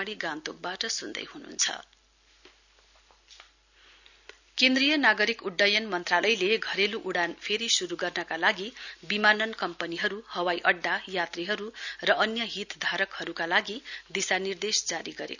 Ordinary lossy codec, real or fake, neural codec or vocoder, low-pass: none; real; none; 7.2 kHz